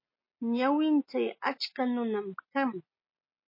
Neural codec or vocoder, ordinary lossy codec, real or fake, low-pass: none; MP3, 24 kbps; real; 5.4 kHz